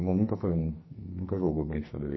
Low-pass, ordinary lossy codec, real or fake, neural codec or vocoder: 7.2 kHz; MP3, 24 kbps; fake; codec, 44.1 kHz, 2.6 kbps, SNAC